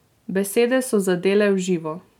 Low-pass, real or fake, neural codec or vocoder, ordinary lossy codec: 19.8 kHz; real; none; none